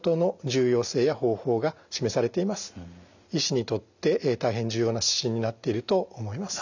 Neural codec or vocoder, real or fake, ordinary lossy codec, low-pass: none; real; none; 7.2 kHz